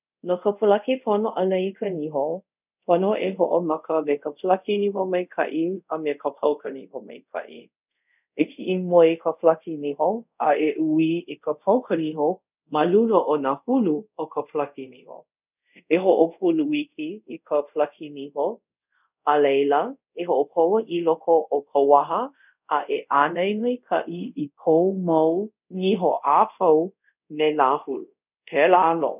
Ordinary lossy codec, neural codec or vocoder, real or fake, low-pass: none; codec, 24 kHz, 0.5 kbps, DualCodec; fake; 3.6 kHz